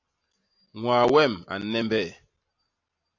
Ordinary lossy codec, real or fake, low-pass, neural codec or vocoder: AAC, 48 kbps; real; 7.2 kHz; none